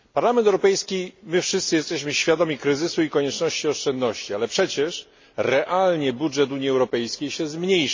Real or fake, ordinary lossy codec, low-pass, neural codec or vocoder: real; MP3, 32 kbps; 7.2 kHz; none